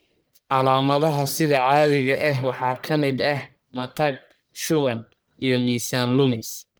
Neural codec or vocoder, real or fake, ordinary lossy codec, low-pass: codec, 44.1 kHz, 1.7 kbps, Pupu-Codec; fake; none; none